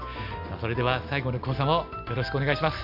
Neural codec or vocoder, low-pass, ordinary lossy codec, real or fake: none; 5.4 kHz; none; real